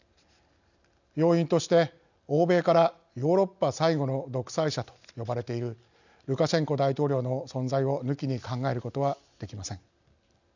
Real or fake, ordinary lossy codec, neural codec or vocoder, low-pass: real; none; none; 7.2 kHz